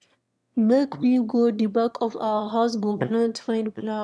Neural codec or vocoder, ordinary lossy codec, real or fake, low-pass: autoencoder, 22.05 kHz, a latent of 192 numbers a frame, VITS, trained on one speaker; none; fake; none